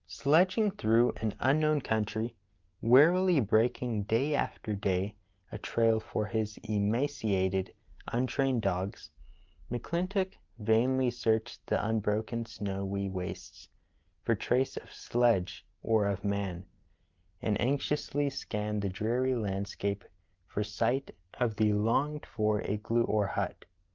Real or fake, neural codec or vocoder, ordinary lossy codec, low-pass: real; none; Opus, 32 kbps; 7.2 kHz